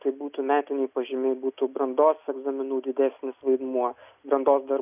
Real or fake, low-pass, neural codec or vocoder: real; 3.6 kHz; none